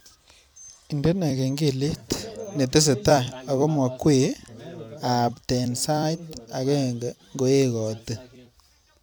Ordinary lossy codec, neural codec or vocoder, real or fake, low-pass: none; vocoder, 44.1 kHz, 128 mel bands every 256 samples, BigVGAN v2; fake; none